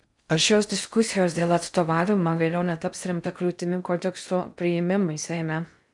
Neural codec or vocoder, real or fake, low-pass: codec, 16 kHz in and 24 kHz out, 0.6 kbps, FocalCodec, streaming, 4096 codes; fake; 10.8 kHz